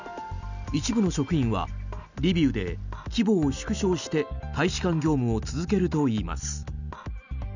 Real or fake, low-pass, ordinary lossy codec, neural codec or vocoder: real; 7.2 kHz; none; none